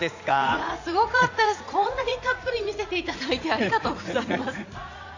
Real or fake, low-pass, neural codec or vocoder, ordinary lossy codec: fake; 7.2 kHz; vocoder, 44.1 kHz, 80 mel bands, Vocos; AAC, 48 kbps